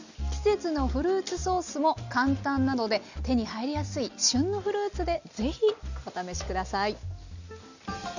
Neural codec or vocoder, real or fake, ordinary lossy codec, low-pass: none; real; none; 7.2 kHz